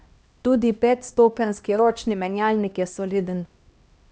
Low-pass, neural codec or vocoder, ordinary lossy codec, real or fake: none; codec, 16 kHz, 1 kbps, X-Codec, HuBERT features, trained on LibriSpeech; none; fake